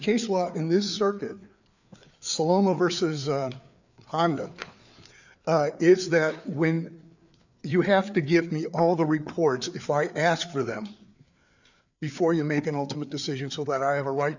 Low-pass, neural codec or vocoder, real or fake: 7.2 kHz; codec, 16 kHz, 4 kbps, FreqCodec, larger model; fake